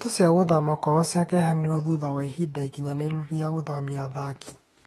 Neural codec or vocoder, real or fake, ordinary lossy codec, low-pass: autoencoder, 48 kHz, 32 numbers a frame, DAC-VAE, trained on Japanese speech; fake; AAC, 32 kbps; 19.8 kHz